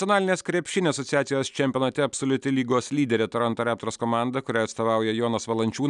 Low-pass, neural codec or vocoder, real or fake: 10.8 kHz; none; real